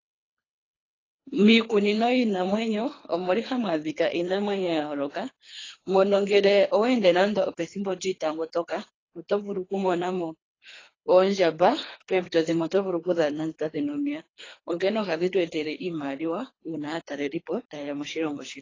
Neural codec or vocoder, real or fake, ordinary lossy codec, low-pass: codec, 24 kHz, 3 kbps, HILCodec; fake; AAC, 32 kbps; 7.2 kHz